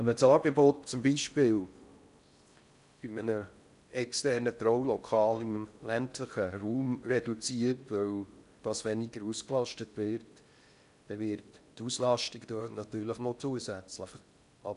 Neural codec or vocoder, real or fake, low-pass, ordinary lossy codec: codec, 16 kHz in and 24 kHz out, 0.6 kbps, FocalCodec, streaming, 4096 codes; fake; 10.8 kHz; none